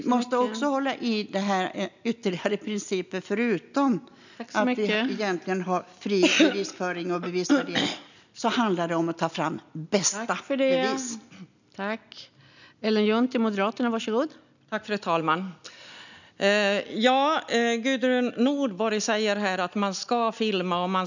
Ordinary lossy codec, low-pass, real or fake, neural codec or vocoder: none; 7.2 kHz; real; none